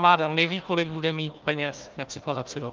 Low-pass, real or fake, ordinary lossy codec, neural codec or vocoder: 7.2 kHz; fake; Opus, 24 kbps; codec, 16 kHz, 1 kbps, FunCodec, trained on Chinese and English, 50 frames a second